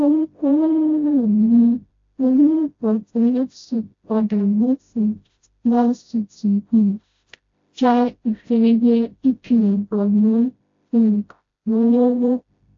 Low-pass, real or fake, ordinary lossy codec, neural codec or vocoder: 7.2 kHz; fake; AAC, 48 kbps; codec, 16 kHz, 0.5 kbps, FreqCodec, smaller model